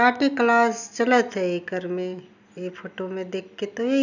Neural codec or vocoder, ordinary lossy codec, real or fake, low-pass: none; none; real; 7.2 kHz